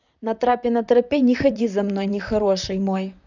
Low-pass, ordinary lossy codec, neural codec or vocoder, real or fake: 7.2 kHz; none; vocoder, 44.1 kHz, 128 mel bands, Pupu-Vocoder; fake